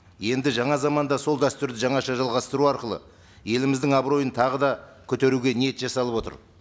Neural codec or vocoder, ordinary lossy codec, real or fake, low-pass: none; none; real; none